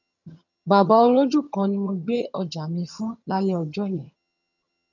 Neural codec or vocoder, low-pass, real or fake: vocoder, 22.05 kHz, 80 mel bands, HiFi-GAN; 7.2 kHz; fake